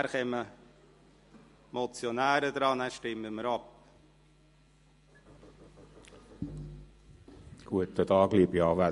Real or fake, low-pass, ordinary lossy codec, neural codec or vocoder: real; 14.4 kHz; MP3, 48 kbps; none